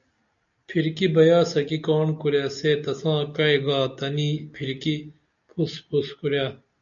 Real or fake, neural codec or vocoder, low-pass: real; none; 7.2 kHz